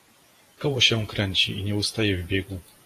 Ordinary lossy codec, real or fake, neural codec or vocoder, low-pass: AAC, 48 kbps; fake; vocoder, 48 kHz, 128 mel bands, Vocos; 14.4 kHz